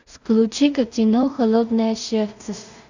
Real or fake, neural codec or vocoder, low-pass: fake; codec, 16 kHz in and 24 kHz out, 0.4 kbps, LongCat-Audio-Codec, two codebook decoder; 7.2 kHz